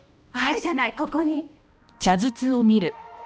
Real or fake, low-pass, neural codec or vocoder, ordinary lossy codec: fake; none; codec, 16 kHz, 1 kbps, X-Codec, HuBERT features, trained on balanced general audio; none